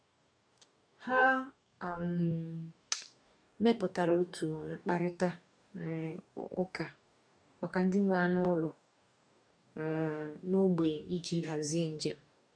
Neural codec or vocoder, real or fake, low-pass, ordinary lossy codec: codec, 44.1 kHz, 2.6 kbps, DAC; fake; 9.9 kHz; none